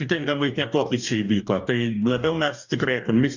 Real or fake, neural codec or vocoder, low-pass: fake; codec, 44.1 kHz, 2.6 kbps, DAC; 7.2 kHz